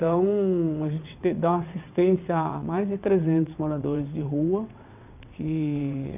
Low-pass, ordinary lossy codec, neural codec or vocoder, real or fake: 3.6 kHz; none; vocoder, 22.05 kHz, 80 mel bands, WaveNeXt; fake